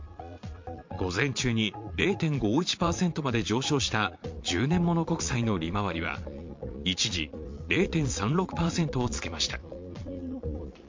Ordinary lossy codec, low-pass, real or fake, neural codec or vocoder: MP3, 48 kbps; 7.2 kHz; fake; vocoder, 22.05 kHz, 80 mel bands, Vocos